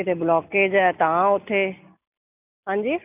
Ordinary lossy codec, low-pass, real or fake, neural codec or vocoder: none; 3.6 kHz; real; none